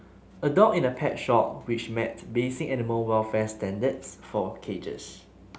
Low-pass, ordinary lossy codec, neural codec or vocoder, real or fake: none; none; none; real